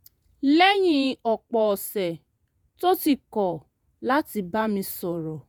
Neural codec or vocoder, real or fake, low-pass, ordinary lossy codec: vocoder, 48 kHz, 128 mel bands, Vocos; fake; none; none